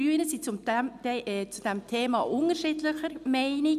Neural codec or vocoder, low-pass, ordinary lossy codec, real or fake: none; 14.4 kHz; none; real